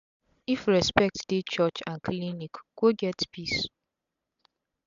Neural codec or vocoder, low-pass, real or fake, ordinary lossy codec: none; 7.2 kHz; real; none